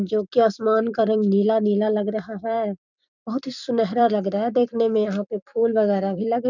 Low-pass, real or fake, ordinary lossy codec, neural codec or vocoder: 7.2 kHz; fake; none; vocoder, 44.1 kHz, 128 mel bands every 256 samples, BigVGAN v2